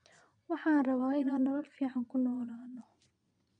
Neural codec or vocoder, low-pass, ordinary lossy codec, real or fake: vocoder, 22.05 kHz, 80 mel bands, Vocos; 9.9 kHz; none; fake